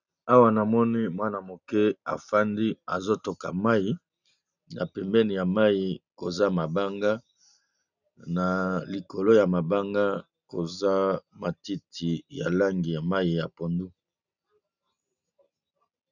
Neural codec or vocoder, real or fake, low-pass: none; real; 7.2 kHz